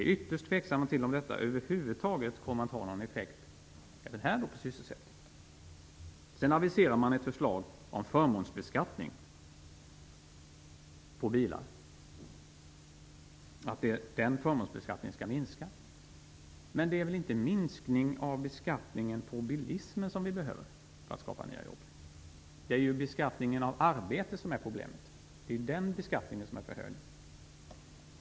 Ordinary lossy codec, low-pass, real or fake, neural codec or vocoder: none; none; real; none